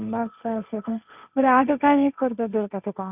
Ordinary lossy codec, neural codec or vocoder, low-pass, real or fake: none; codec, 16 kHz, 1.1 kbps, Voila-Tokenizer; 3.6 kHz; fake